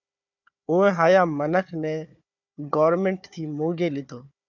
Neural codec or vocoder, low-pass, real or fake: codec, 16 kHz, 4 kbps, FunCodec, trained on Chinese and English, 50 frames a second; 7.2 kHz; fake